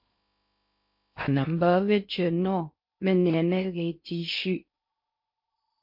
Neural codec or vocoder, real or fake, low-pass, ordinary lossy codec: codec, 16 kHz in and 24 kHz out, 0.6 kbps, FocalCodec, streaming, 2048 codes; fake; 5.4 kHz; MP3, 32 kbps